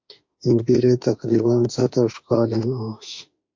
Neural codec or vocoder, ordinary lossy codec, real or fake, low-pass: autoencoder, 48 kHz, 32 numbers a frame, DAC-VAE, trained on Japanese speech; MP3, 48 kbps; fake; 7.2 kHz